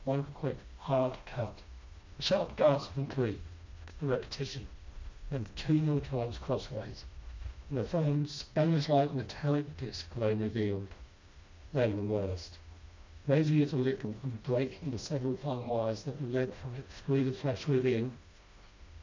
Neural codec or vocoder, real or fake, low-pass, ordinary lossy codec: codec, 16 kHz, 1 kbps, FreqCodec, smaller model; fake; 7.2 kHz; MP3, 48 kbps